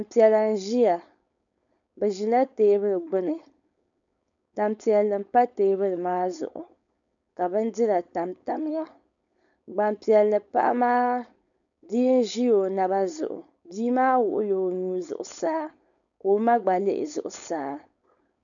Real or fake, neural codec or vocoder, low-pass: fake; codec, 16 kHz, 4.8 kbps, FACodec; 7.2 kHz